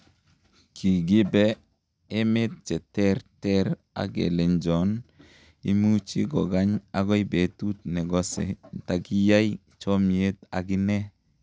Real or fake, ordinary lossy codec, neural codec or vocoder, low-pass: real; none; none; none